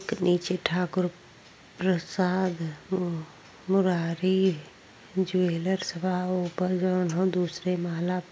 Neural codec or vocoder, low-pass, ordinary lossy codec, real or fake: none; none; none; real